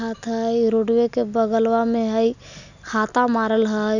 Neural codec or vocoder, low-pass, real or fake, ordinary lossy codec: none; 7.2 kHz; real; none